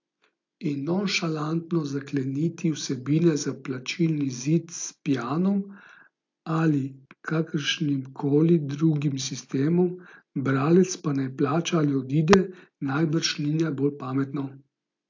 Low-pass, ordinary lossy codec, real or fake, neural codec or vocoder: 7.2 kHz; AAC, 48 kbps; real; none